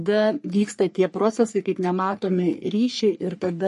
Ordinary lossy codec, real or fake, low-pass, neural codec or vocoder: MP3, 48 kbps; fake; 14.4 kHz; codec, 44.1 kHz, 3.4 kbps, Pupu-Codec